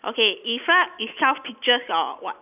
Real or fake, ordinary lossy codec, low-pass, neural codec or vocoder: real; none; 3.6 kHz; none